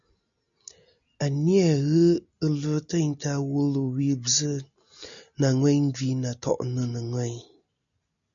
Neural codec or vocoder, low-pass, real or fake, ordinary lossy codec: none; 7.2 kHz; real; MP3, 96 kbps